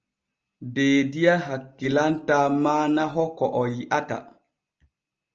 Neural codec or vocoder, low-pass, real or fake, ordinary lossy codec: none; 7.2 kHz; real; Opus, 24 kbps